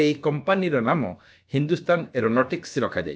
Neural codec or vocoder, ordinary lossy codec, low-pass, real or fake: codec, 16 kHz, about 1 kbps, DyCAST, with the encoder's durations; none; none; fake